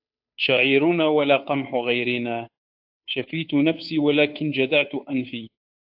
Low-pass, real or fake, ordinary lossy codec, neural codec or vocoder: 5.4 kHz; fake; AAC, 48 kbps; codec, 16 kHz, 2 kbps, FunCodec, trained on Chinese and English, 25 frames a second